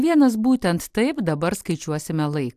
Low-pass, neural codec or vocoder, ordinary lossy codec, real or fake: 14.4 kHz; none; AAC, 96 kbps; real